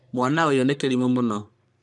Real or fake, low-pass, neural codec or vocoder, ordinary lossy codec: fake; 10.8 kHz; codec, 44.1 kHz, 3.4 kbps, Pupu-Codec; none